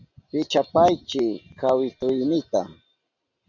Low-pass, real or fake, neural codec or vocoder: 7.2 kHz; real; none